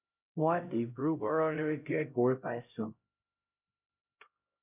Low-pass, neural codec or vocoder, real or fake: 3.6 kHz; codec, 16 kHz, 0.5 kbps, X-Codec, HuBERT features, trained on LibriSpeech; fake